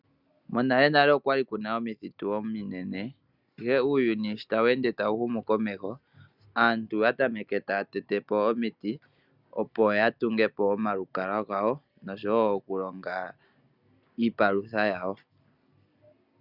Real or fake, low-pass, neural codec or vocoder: real; 5.4 kHz; none